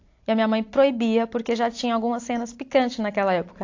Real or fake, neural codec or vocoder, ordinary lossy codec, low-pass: real; none; AAC, 48 kbps; 7.2 kHz